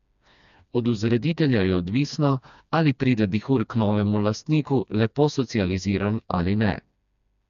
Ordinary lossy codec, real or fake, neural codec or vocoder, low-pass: none; fake; codec, 16 kHz, 2 kbps, FreqCodec, smaller model; 7.2 kHz